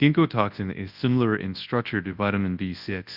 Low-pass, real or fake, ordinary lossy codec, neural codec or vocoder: 5.4 kHz; fake; Opus, 32 kbps; codec, 24 kHz, 0.9 kbps, WavTokenizer, large speech release